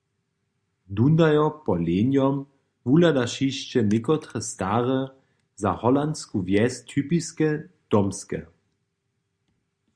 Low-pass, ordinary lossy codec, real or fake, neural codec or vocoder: 9.9 kHz; Opus, 64 kbps; real; none